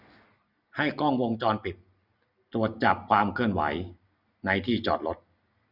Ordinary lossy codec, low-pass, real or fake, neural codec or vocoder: Opus, 64 kbps; 5.4 kHz; real; none